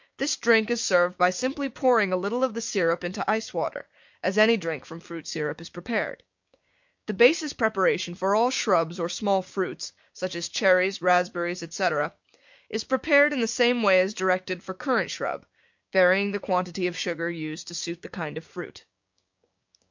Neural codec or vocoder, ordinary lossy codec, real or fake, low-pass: codec, 16 kHz, 6 kbps, DAC; MP3, 48 kbps; fake; 7.2 kHz